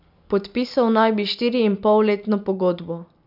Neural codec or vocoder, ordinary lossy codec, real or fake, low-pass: none; none; real; 5.4 kHz